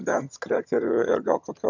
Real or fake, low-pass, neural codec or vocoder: fake; 7.2 kHz; vocoder, 22.05 kHz, 80 mel bands, HiFi-GAN